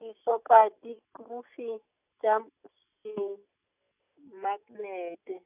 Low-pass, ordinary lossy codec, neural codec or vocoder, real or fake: 3.6 kHz; none; none; real